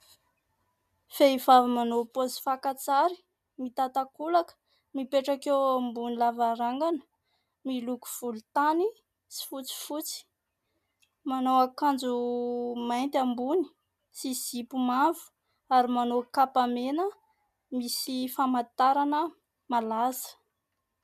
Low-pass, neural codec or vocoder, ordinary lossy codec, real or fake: 14.4 kHz; none; MP3, 96 kbps; real